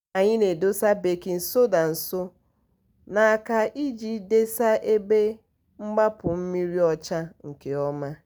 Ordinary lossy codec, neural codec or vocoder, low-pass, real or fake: none; none; none; real